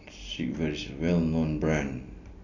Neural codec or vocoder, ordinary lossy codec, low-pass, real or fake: none; none; 7.2 kHz; real